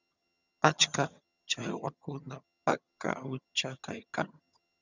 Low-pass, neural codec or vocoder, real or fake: 7.2 kHz; vocoder, 22.05 kHz, 80 mel bands, HiFi-GAN; fake